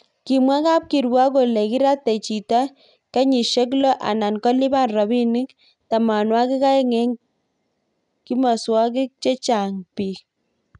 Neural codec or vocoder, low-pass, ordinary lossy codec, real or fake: none; 10.8 kHz; none; real